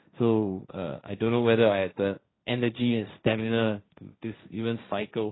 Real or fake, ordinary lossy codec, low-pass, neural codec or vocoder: fake; AAC, 16 kbps; 7.2 kHz; codec, 16 kHz, 1.1 kbps, Voila-Tokenizer